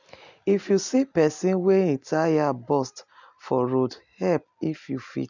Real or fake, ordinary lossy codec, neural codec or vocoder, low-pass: real; none; none; 7.2 kHz